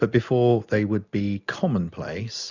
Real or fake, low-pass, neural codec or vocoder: real; 7.2 kHz; none